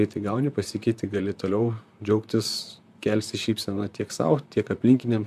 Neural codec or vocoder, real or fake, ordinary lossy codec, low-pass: vocoder, 44.1 kHz, 128 mel bands, Pupu-Vocoder; fake; MP3, 96 kbps; 14.4 kHz